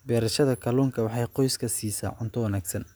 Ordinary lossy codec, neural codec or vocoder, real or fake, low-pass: none; none; real; none